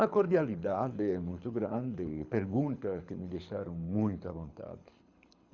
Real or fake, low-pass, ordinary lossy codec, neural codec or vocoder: fake; 7.2 kHz; Opus, 64 kbps; codec, 24 kHz, 6 kbps, HILCodec